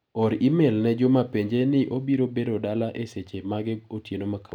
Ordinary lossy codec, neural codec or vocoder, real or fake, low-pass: none; none; real; 19.8 kHz